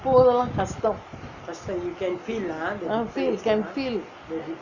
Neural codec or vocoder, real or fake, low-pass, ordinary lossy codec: none; real; 7.2 kHz; none